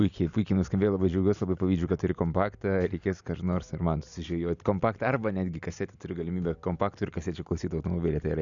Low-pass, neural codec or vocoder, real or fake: 7.2 kHz; none; real